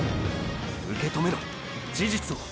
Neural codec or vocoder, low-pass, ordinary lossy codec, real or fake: none; none; none; real